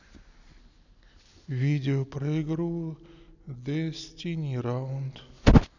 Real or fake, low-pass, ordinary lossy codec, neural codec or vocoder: real; 7.2 kHz; none; none